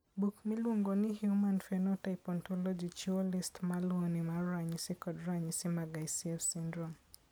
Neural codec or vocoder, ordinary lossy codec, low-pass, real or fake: none; none; none; real